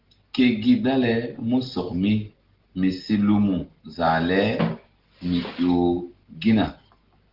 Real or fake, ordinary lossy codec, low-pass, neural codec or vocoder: real; Opus, 16 kbps; 5.4 kHz; none